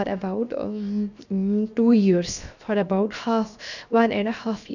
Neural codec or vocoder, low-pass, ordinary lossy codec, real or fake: codec, 16 kHz, 0.7 kbps, FocalCodec; 7.2 kHz; none; fake